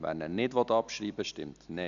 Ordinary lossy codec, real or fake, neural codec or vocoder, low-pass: MP3, 96 kbps; real; none; 7.2 kHz